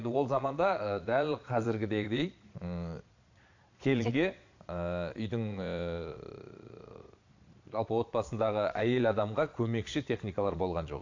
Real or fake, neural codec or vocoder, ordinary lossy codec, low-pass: fake; vocoder, 22.05 kHz, 80 mel bands, Vocos; AAC, 48 kbps; 7.2 kHz